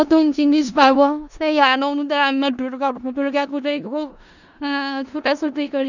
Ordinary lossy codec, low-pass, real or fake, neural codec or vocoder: none; 7.2 kHz; fake; codec, 16 kHz in and 24 kHz out, 0.4 kbps, LongCat-Audio-Codec, four codebook decoder